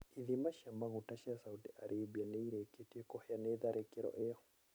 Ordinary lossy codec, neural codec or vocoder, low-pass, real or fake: none; none; none; real